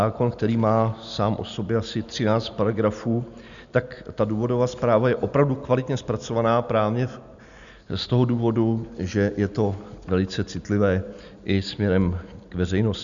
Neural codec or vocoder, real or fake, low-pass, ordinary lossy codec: none; real; 7.2 kHz; MP3, 96 kbps